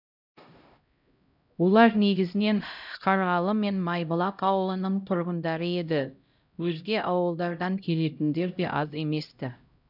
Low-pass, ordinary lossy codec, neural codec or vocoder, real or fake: 5.4 kHz; none; codec, 16 kHz, 0.5 kbps, X-Codec, HuBERT features, trained on LibriSpeech; fake